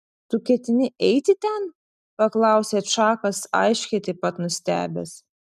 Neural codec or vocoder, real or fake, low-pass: none; real; 14.4 kHz